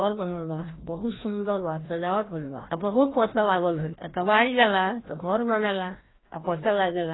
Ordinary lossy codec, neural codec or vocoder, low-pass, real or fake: AAC, 16 kbps; codec, 16 kHz, 1 kbps, FreqCodec, larger model; 7.2 kHz; fake